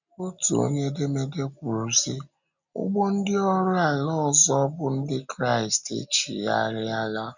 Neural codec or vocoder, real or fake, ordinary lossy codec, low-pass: none; real; none; 7.2 kHz